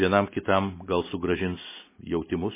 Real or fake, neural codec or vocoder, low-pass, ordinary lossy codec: real; none; 3.6 kHz; MP3, 16 kbps